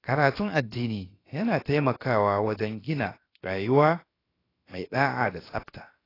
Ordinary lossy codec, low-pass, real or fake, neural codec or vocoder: AAC, 24 kbps; 5.4 kHz; fake; codec, 16 kHz, about 1 kbps, DyCAST, with the encoder's durations